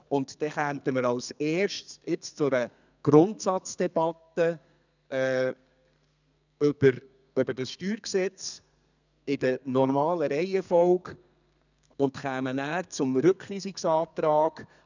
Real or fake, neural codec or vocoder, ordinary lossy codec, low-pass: fake; codec, 32 kHz, 1.9 kbps, SNAC; none; 7.2 kHz